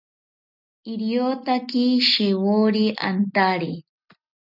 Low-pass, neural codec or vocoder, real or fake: 5.4 kHz; none; real